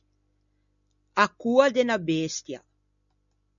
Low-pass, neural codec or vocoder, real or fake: 7.2 kHz; none; real